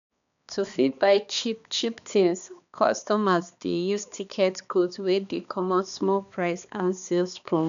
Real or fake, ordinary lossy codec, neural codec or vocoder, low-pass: fake; none; codec, 16 kHz, 2 kbps, X-Codec, HuBERT features, trained on balanced general audio; 7.2 kHz